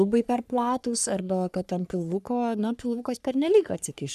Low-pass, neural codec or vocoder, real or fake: 14.4 kHz; codec, 44.1 kHz, 3.4 kbps, Pupu-Codec; fake